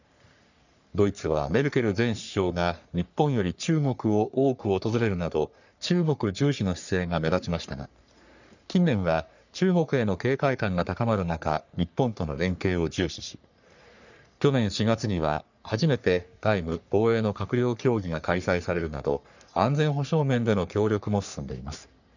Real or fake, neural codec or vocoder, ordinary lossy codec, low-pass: fake; codec, 44.1 kHz, 3.4 kbps, Pupu-Codec; none; 7.2 kHz